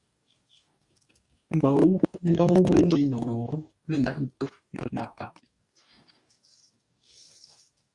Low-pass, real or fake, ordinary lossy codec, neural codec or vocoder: 10.8 kHz; fake; Opus, 64 kbps; codec, 44.1 kHz, 2.6 kbps, DAC